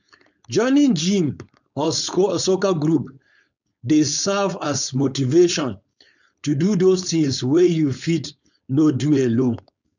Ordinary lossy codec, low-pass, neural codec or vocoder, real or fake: none; 7.2 kHz; codec, 16 kHz, 4.8 kbps, FACodec; fake